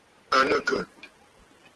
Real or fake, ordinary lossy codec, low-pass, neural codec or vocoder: real; Opus, 16 kbps; 10.8 kHz; none